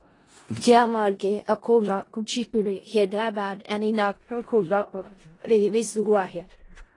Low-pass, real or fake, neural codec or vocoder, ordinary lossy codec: 10.8 kHz; fake; codec, 16 kHz in and 24 kHz out, 0.4 kbps, LongCat-Audio-Codec, four codebook decoder; AAC, 32 kbps